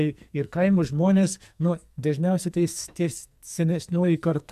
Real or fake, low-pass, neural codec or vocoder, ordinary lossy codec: fake; 14.4 kHz; codec, 44.1 kHz, 2.6 kbps, SNAC; MP3, 96 kbps